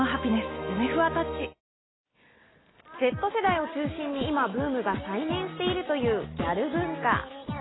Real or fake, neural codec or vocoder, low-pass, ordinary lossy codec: real; none; 7.2 kHz; AAC, 16 kbps